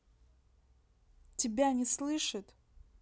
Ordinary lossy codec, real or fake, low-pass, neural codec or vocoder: none; real; none; none